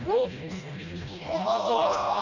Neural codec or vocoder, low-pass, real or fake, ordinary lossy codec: codec, 16 kHz, 1 kbps, FreqCodec, smaller model; 7.2 kHz; fake; none